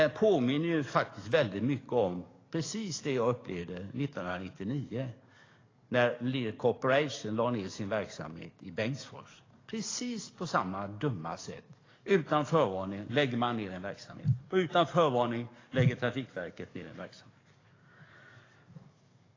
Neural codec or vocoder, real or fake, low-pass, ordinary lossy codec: codec, 44.1 kHz, 7.8 kbps, DAC; fake; 7.2 kHz; AAC, 32 kbps